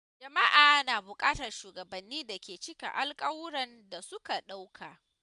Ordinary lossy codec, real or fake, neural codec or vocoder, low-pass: none; real; none; 10.8 kHz